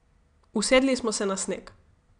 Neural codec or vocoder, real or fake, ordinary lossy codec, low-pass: none; real; none; 9.9 kHz